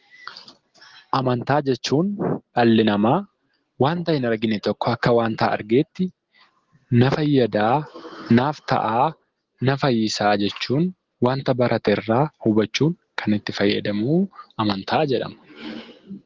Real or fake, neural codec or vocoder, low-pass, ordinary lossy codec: real; none; 7.2 kHz; Opus, 16 kbps